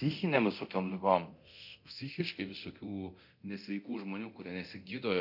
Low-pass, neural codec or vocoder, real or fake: 5.4 kHz; codec, 24 kHz, 0.9 kbps, DualCodec; fake